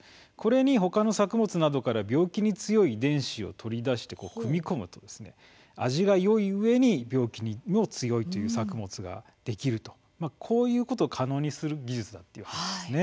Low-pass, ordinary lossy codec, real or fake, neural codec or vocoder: none; none; real; none